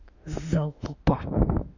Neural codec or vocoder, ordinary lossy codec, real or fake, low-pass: autoencoder, 48 kHz, 32 numbers a frame, DAC-VAE, trained on Japanese speech; none; fake; 7.2 kHz